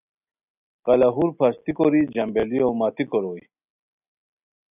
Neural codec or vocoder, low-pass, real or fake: none; 3.6 kHz; real